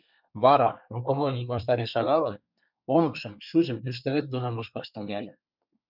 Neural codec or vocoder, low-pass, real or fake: codec, 24 kHz, 1 kbps, SNAC; 5.4 kHz; fake